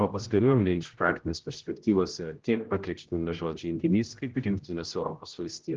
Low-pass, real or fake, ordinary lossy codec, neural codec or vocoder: 7.2 kHz; fake; Opus, 16 kbps; codec, 16 kHz, 0.5 kbps, X-Codec, HuBERT features, trained on general audio